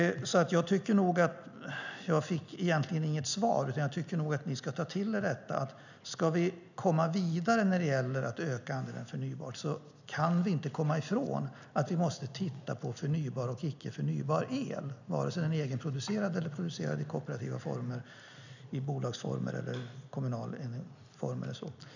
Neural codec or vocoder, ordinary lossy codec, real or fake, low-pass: none; none; real; 7.2 kHz